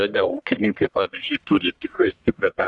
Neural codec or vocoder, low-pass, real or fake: codec, 44.1 kHz, 1.7 kbps, Pupu-Codec; 10.8 kHz; fake